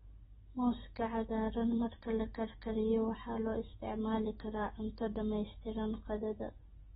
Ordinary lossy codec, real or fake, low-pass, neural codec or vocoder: AAC, 16 kbps; real; 10.8 kHz; none